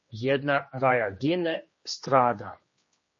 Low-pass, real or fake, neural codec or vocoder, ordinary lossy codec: 7.2 kHz; fake; codec, 16 kHz, 2 kbps, X-Codec, HuBERT features, trained on general audio; MP3, 32 kbps